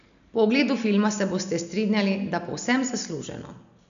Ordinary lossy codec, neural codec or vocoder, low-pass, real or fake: none; none; 7.2 kHz; real